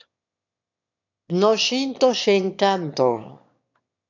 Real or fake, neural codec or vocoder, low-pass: fake; autoencoder, 22.05 kHz, a latent of 192 numbers a frame, VITS, trained on one speaker; 7.2 kHz